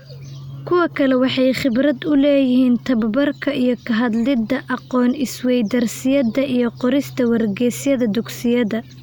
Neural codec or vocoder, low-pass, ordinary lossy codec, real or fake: none; none; none; real